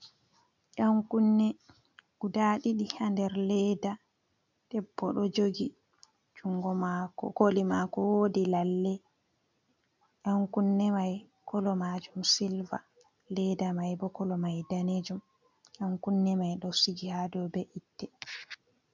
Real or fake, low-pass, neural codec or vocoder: real; 7.2 kHz; none